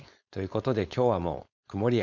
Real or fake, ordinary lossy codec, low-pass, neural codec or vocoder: fake; none; 7.2 kHz; codec, 16 kHz, 4.8 kbps, FACodec